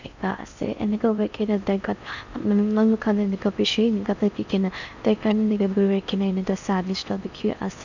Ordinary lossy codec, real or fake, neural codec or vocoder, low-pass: none; fake; codec, 16 kHz in and 24 kHz out, 0.6 kbps, FocalCodec, streaming, 4096 codes; 7.2 kHz